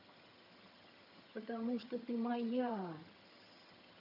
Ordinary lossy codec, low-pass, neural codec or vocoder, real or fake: none; 5.4 kHz; vocoder, 22.05 kHz, 80 mel bands, HiFi-GAN; fake